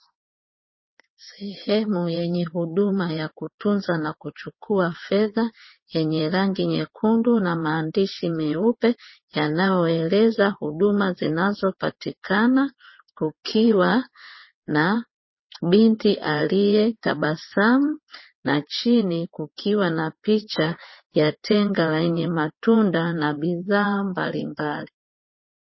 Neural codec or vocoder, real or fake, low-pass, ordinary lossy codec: vocoder, 22.05 kHz, 80 mel bands, WaveNeXt; fake; 7.2 kHz; MP3, 24 kbps